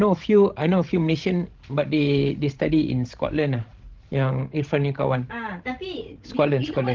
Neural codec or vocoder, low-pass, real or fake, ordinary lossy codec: codec, 16 kHz, 16 kbps, FreqCodec, larger model; 7.2 kHz; fake; Opus, 16 kbps